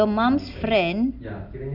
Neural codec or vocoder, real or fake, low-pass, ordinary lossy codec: none; real; 5.4 kHz; AAC, 48 kbps